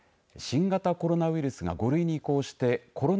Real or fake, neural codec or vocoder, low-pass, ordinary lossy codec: real; none; none; none